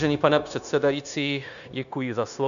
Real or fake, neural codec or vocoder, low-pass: fake; codec, 16 kHz, 0.9 kbps, LongCat-Audio-Codec; 7.2 kHz